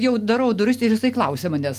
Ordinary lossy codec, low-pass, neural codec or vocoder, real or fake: Opus, 32 kbps; 14.4 kHz; none; real